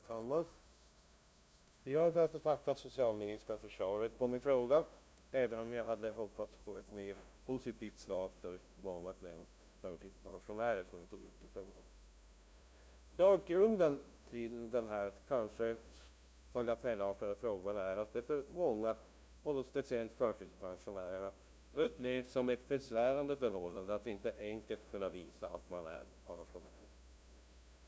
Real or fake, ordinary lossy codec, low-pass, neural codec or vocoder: fake; none; none; codec, 16 kHz, 0.5 kbps, FunCodec, trained on LibriTTS, 25 frames a second